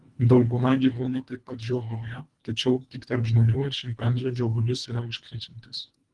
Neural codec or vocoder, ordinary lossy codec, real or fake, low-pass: codec, 24 kHz, 1.5 kbps, HILCodec; Opus, 24 kbps; fake; 10.8 kHz